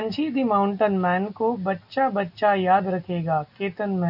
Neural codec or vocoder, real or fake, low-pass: none; real; 5.4 kHz